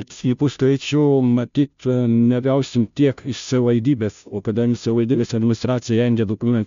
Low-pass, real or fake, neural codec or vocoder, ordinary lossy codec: 7.2 kHz; fake; codec, 16 kHz, 0.5 kbps, FunCodec, trained on Chinese and English, 25 frames a second; MP3, 64 kbps